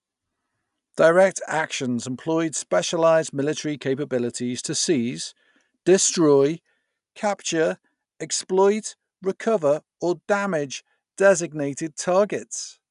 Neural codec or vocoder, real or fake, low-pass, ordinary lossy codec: none; real; 10.8 kHz; none